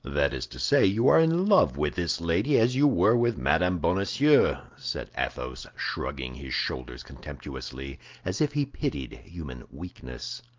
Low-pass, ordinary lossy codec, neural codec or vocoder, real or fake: 7.2 kHz; Opus, 24 kbps; none; real